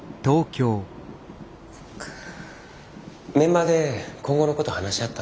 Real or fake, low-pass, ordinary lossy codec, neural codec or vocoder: real; none; none; none